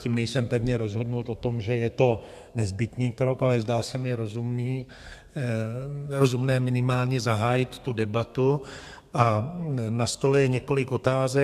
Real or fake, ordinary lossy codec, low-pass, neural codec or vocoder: fake; AAC, 96 kbps; 14.4 kHz; codec, 32 kHz, 1.9 kbps, SNAC